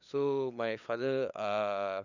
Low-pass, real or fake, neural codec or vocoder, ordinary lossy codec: 7.2 kHz; fake; codec, 16 kHz, 8 kbps, FunCodec, trained on LibriTTS, 25 frames a second; none